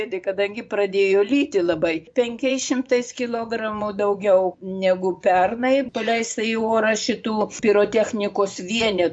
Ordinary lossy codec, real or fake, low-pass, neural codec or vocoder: MP3, 64 kbps; fake; 9.9 kHz; vocoder, 24 kHz, 100 mel bands, Vocos